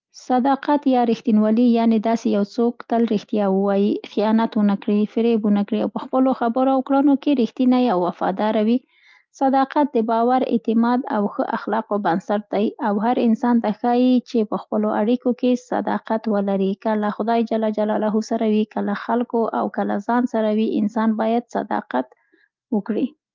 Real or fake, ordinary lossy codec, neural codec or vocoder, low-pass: real; Opus, 24 kbps; none; 7.2 kHz